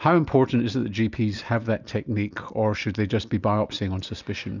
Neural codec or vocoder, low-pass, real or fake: none; 7.2 kHz; real